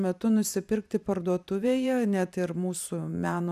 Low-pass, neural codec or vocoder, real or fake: 14.4 kHz; vocoder, 44.1 kHz, 128 mel bands every 512 samples, BigVGAN v2; fake